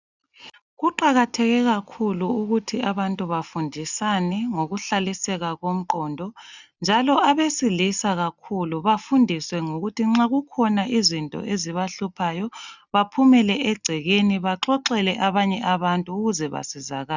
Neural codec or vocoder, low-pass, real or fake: none; 7.2 kHz; real